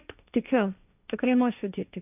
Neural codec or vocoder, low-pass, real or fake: codec, 16 kHz, 1.1 kbps, Voila-Tokenizer; 3.6 kHz; fake